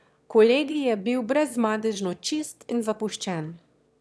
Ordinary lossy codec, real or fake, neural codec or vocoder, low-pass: none; fake; autoencoder, 22.05 kHz, a latent of 192 numbers a frame, VITS, trained on one speaker; none